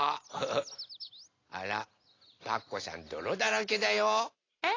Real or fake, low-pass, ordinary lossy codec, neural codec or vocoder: real; 7.2 kHz; AAC, 32 kbps; none